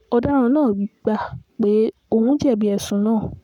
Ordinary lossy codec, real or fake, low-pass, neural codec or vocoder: none; fake; 19.8 kHz; codec, 44.1 kHz, 7.8 kbps, Pupu-Codec